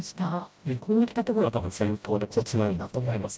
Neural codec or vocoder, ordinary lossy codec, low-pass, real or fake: codec, 16 kHz, 0.5 kbps, FreqCodec, smaller model; none; none; fake